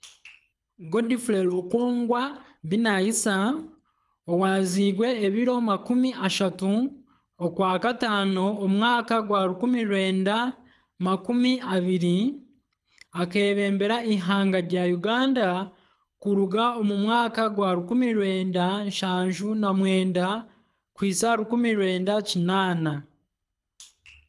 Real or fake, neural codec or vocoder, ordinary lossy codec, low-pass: fake; codec, 24 kHz, 6 kbps, HILCodec; none; none